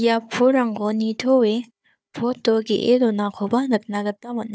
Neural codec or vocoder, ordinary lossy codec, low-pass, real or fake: codec, 16 kHz, 4 kbps, FunCodec, trained on Chinese and English, 50 frames a second; none; none; fake